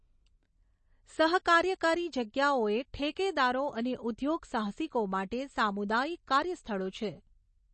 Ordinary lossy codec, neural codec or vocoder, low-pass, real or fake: MP3, 32 kbps; none; 10.8 kHz; real